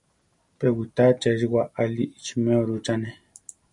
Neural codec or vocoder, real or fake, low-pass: none; real; 10.8 kHz